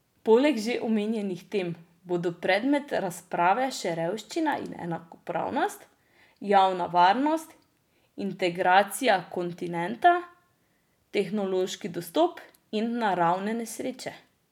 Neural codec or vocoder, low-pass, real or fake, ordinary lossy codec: none; 19.8 kHz; real; none